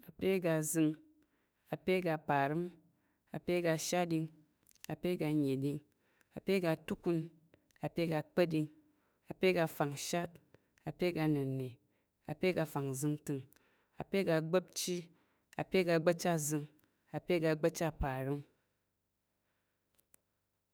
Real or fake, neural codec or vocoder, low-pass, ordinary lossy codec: fake; autoencoder, 48 kHz, 32 numbers a frame, DAC-VAE, trained on Japanese speech; none; none